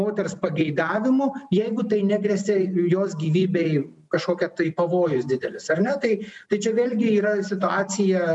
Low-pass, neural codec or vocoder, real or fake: 10.8 kHz; none; real